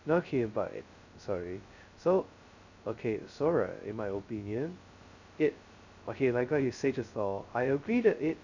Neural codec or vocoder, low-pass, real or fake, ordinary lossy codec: codec, 16 kHz, 0.2 kbps, FocalCodec; 7.2 kHz; fake; none